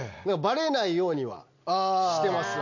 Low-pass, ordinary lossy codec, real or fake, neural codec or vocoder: 7.2 kHz; none; real; none